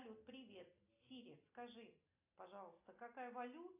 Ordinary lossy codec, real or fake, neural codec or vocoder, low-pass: AAC, 24 kbps; real; none; 3.6 kHz